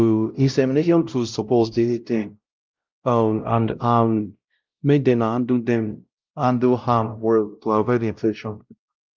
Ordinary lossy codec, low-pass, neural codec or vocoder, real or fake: Opus, 24 kbps; 7.2 kHz; codec, 16 kHz, 0.5 kbps, X-Codec, WavLM features, trained on Multilingual LibriSpeech; fake